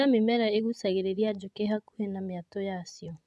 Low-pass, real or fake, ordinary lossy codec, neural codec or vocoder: none; fake; none; vocoder, 24 kHz, 100 mel bands, Vocos